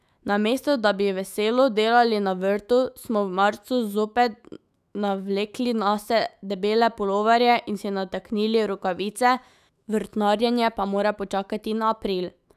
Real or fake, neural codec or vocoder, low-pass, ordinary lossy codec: fake; autoencoder, 48 kHz, 128 numbers a frame, DAC-VAE, trained on Japanese speech; 14.4 kHz; none